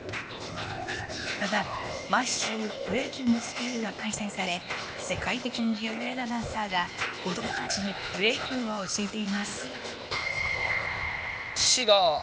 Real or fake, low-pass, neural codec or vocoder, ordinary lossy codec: fake; none; codec, 16 kHz, 0.8 kbps, ZipCodec; none